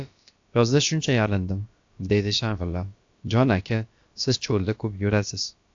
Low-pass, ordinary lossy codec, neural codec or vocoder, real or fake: 7.2 kHz; AAC, 64 kbps; codec, 16 kHz, about 1 kbps, DyCAST, with the encoder's durations; fake